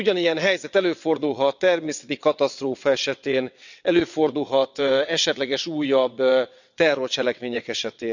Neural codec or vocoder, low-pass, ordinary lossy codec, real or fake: vocoder, 22.05 kHz, 80 mel bands, WaveNeXt; 7.2 kHz; none; fake